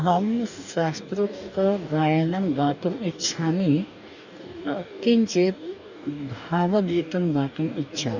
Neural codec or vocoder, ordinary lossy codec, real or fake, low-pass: codec, 44.1 kHz, 2.6 kbps, DAC; none; fake; 7.2 kHz